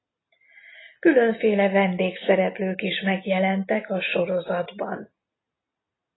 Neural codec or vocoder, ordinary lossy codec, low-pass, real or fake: none; AAC, 16 kbps; 7.2 kHz; real